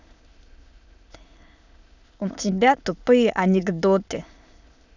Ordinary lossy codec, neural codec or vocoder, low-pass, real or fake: none; autoencoder, 22.05 kHz, a latent of 192 numbers a frame, VITS, trained on many speakers; 7.2 kHz; fake